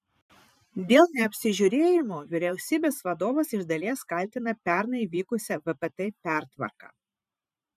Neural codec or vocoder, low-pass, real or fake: vocoder, 44.1 kHz, 128 mel bands every 512 samples, BigVGAN v2; 14.4 kHz; fake